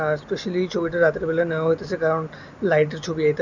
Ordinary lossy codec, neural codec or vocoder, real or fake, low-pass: none; none; real; 7.2 kHz